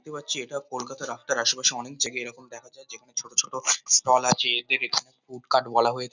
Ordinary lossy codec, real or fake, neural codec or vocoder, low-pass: none; real; none; 7.2 kHz